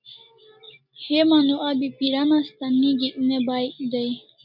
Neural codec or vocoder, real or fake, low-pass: none; real; 5.4 kHz